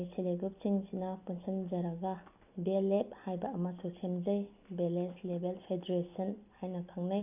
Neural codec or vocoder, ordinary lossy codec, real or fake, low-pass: none; none; real; 3.6 kHz